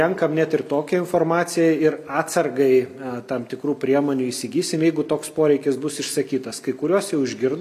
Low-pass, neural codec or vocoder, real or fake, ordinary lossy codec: 14.4 kHz; none; real; MP3, 96 kbps